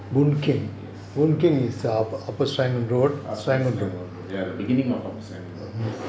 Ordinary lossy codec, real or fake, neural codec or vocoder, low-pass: none; real; none; none